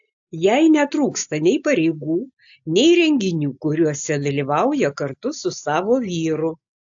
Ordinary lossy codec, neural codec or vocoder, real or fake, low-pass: AAC, 64 kbps; none; real; 7.2 kHz